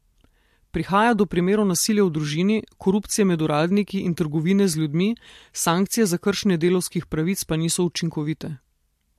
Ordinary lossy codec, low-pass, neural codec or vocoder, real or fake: MP3, 64 kbps; 14.4 kHz; none; real